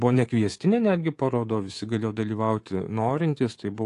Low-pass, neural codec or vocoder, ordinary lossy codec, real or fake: 10.8 kHz; codec, 24 kHz, 3.1 kbps, DualCodec; AAC, 48 kbps; fake